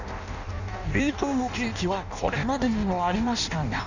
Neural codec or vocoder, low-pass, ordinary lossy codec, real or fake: codec, 16 kHz in and 24 kHz out, 0.6 kbps, FireRedTTS-2 codec; 7.2 kHz; none; fake